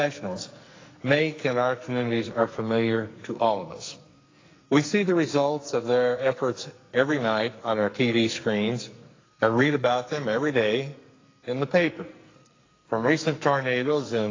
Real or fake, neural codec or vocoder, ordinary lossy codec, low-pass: fake; codec, 44.1 kHz, 2.6 kbps, SNAC; AAC, 32 kbps; 7.2 kHz